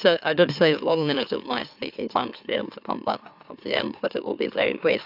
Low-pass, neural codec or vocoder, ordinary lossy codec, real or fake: 5.4 kHz; autoencoder, 44.1 kHz, a latent of 192 numbers a frame, MeloTTS; AAC, 48 kbps; fake